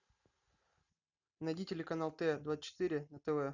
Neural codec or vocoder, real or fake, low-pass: none; real; 7.2 kHz